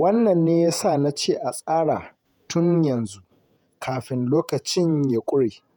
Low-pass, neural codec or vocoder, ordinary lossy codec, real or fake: none; vocoder, 48 kHz, 128 mel bands, Vocos; none; fake